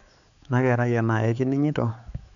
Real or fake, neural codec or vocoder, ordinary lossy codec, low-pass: fake; codec, 16 kHz, 4 kbps, X-Codec, HuBERT features, trained on balanced general audio; none; 7.2 kHz